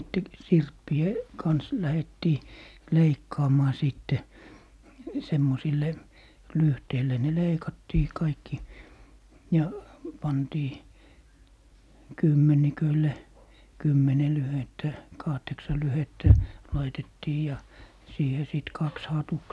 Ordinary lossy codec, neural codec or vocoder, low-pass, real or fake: none; none; none; real